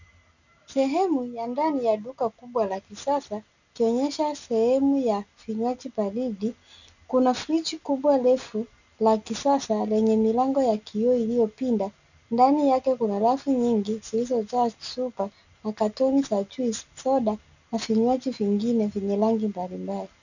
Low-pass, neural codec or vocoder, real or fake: 7.2 kHz; none; real